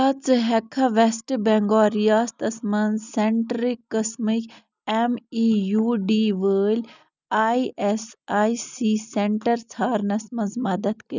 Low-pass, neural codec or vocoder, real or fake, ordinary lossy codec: 7.2 kHz; none; real; none